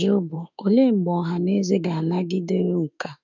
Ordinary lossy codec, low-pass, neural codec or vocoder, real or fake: none; 7.2 kHz; autoencoder, 48 kHz, 32 numbers a frame, DAC-VAE, trained on Japanese speech; fake